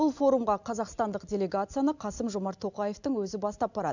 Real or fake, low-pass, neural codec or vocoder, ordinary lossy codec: real; 7.2 kHz; none; none